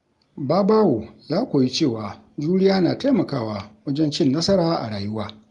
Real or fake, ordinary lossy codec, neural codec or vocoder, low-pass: real; Opus, 32 kbps; none; 10.8 kHz